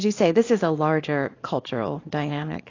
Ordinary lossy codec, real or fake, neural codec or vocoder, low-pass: AAC, 32 kbps; fake; codec, 24 kHz, 0.9 kbps, WavTokenizer, small release; 7.2 kHz